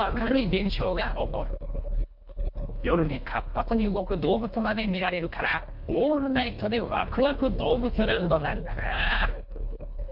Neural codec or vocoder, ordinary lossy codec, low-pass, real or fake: codec, 24 kHz, 1.5 kbps, HILCodec; MP3, 48 kbps; 5.4 kHz; fake